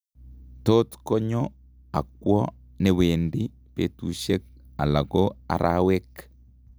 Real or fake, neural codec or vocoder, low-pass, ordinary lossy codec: real; none; none; none